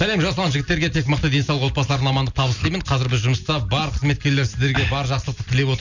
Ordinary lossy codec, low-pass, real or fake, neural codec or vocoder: none; 7.2 kHz; real; none